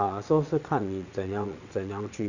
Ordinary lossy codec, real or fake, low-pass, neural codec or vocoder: none; fake; 7.2 kHz; vocoder, 22.05 kHz, 80 mel bands, WaveNeXt